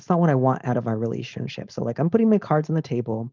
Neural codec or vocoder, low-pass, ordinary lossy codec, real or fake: codec, 16 kHz, 4.8 kbps, FACodec; 7.2 kHz; Opus, 32 kbps; fake